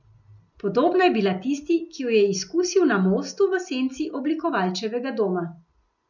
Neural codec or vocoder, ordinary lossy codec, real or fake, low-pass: none; none; real; 7.2 kHz